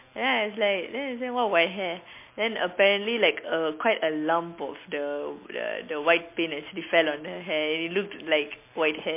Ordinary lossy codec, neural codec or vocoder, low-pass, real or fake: MP3, 24 kbps; none; 3.6 kHz; real